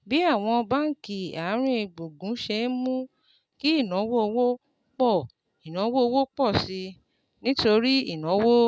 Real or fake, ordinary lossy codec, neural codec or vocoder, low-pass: real; none; none; none